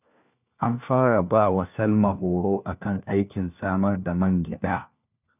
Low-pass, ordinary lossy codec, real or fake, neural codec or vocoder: 3.6 kHz; none; fake; codec, 16 kHz, 1 kbps, FunCodec, trained on LibriTTS, 50 frames a second